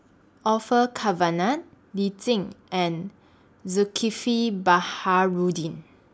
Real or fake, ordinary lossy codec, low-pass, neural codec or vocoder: real; none; none; none